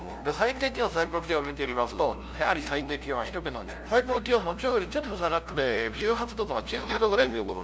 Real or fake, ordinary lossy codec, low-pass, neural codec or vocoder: fake; none; none; codec, 16 kHz, 0.5 kbps, FunCodec, trained on LibriTTS, 25 frames a second